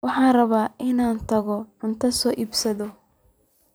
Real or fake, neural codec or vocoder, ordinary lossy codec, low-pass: fake; vocoder, 44.1 kHz, 128 mel bands every 256 samples, BigVGAN v2; none; none